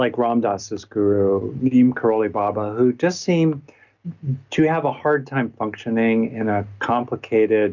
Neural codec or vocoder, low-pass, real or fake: none; 7.2 kHz; real